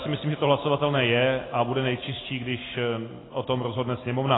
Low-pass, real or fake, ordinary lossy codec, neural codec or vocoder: 7.2 kHz; real; AAC, 16 kbps; none